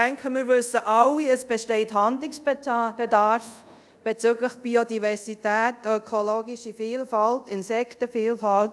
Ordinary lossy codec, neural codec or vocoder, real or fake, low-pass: none; codec, 24 kHz, 0.5 kbps, DualCodec; fake; none